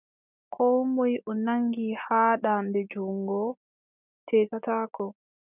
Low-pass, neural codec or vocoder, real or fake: 3.6 kHz; none; real